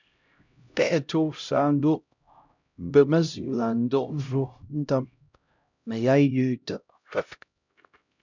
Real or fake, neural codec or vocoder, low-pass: fake; codec, 16 kHz, 0.5 kbps, X-Codec, HuBERT features, trained on LibriSpeech; 7.2 kHz